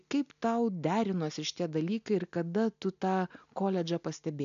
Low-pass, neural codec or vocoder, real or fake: 7.2 kHz; none; real